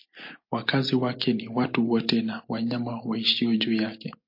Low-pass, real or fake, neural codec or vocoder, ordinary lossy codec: 5.4 kHz; real; none; MP3, 32 kbps